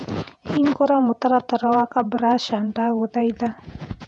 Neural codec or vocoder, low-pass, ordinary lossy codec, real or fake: vocoder, 48 kHz, 128 mel bands, Vocos; 10.8 kHz; none; fake